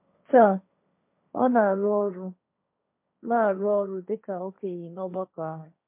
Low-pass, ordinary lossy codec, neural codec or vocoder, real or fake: 3.6 kHz; MP3, 32 kbps; codec, 16 kHz, 1.1 kbps, Voila-Tokenizer; fake